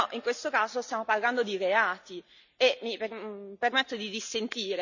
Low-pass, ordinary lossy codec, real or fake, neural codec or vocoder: 7.2 kHz; none; real; none